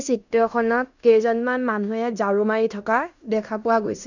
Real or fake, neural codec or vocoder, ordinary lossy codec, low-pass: fake; codec, 16 kHz in and 24 kHz out, 0.9 kbps, LongCat-Audio-Codec, fine tuned four codebook decoder; none; 7.2 kHz